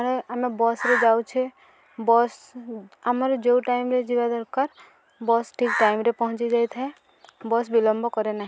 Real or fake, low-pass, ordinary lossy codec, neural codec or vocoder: real; none; none; none